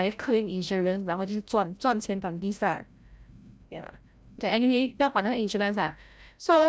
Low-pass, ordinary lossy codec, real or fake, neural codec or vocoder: none; none; fake; codec, 16 kHz, 0.5 kbps, FreqCodec, larger model